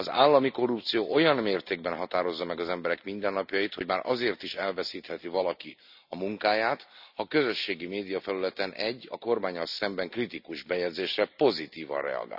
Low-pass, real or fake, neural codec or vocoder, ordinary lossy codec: 5.4 kHz; real; none; none